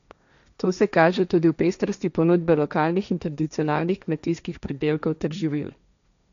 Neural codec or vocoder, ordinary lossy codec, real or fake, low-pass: codec, 16 kHz, 1.1 kbps, Voila-Tokenizer; none; fake; 7.2 kHz